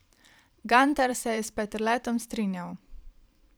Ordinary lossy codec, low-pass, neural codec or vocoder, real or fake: none; none; vocoder, 44.1 kHz, 128 mel bands every 512 samples, BigVGAN v2; fake